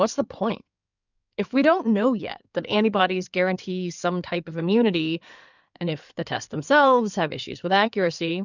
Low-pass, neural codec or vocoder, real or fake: 7.2 kHz; codec, 16 kHz in and 24 kHz out, 2.2 kbps, FireRedTTS-2 codec; fake